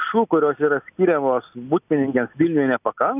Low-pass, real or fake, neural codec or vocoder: 3.6 kHz; real; none